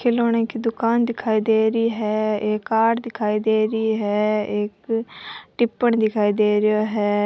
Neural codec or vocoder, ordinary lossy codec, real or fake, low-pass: none; none; real; none